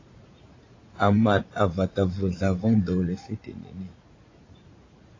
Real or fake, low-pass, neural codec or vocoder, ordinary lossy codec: fake; 7.2 kHz; vocoder, 44.1 kHz, 80 mel bands, Vocos; AAC, 32 kbps